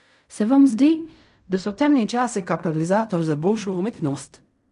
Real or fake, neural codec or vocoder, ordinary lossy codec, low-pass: fake; codec, 16 kHz in and 24 kHz out, 0.4 kbps, LongCat-Audio-Codec, fine tuned four codebook decoder; none; 10.8 kHz